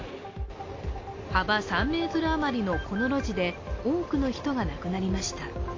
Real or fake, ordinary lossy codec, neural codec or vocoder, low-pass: real; AAC, 32 kbps; none; 7.2 kHz